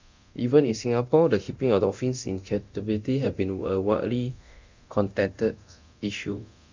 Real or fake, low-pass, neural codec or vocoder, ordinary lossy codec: fake; 7.2 kHz; codec, 24 kHz, 0.9 kbps, DualCodec; MP3, 64 kbps